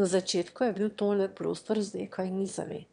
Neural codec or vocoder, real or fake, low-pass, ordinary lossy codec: autoencoder, 22.05 kHz, a latent of 192 numbers a frame, VITS, trained on one speaker; fake; 9.9 kHz; MP3, 96 kbps